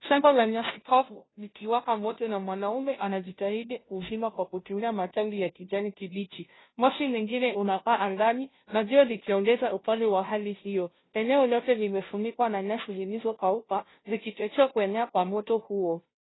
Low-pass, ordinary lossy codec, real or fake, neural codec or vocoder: 7.2 kHz; AAC, 16 kbps; fake; codec, 16 kHz, 0.5 kbps, FunCodec, trained on Chinese and English, 25 frames a second